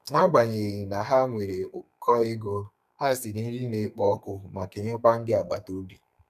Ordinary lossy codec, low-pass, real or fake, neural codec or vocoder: none; 14.4 kHz; fake; codec, 32 kHz, 1.9 kbps, SNAC